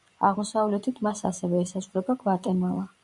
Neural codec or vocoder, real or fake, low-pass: vocoder, 24 kHz, 100 mel bands, Vocos; fake; 10.8 kHz